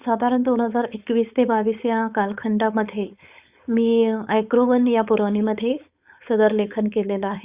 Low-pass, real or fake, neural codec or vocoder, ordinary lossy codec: 3.6 kHz; fake; codec, 16 kHz, 4.8 kbps, FACodec; Opus, 64 kbps